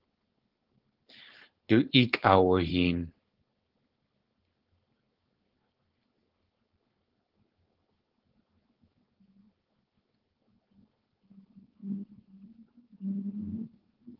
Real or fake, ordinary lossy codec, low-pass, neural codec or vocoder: fake; Opus, 16 kbps; 5.4 kHz; codec, 16 kHz, 4.8 kbps, FACodec